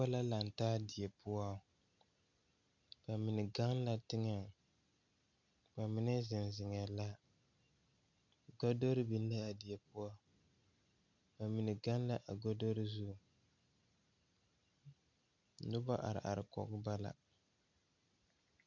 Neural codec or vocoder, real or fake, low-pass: none; real; 7.2 kHz